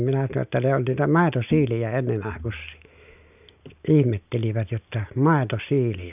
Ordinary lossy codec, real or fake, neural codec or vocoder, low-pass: none; real; none; 3.6 kHz